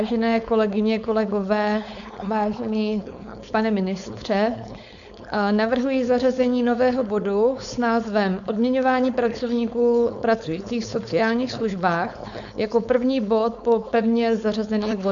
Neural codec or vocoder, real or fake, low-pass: codec, 16 kHz, 4.8 kbps, FACodec; fake; 7.2 kHz